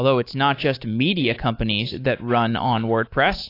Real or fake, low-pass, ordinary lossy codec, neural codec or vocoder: fake; 5.4 kHz; AAC, 32 kbps; codec, 24 kHz, 3.1 kbps, DualCodec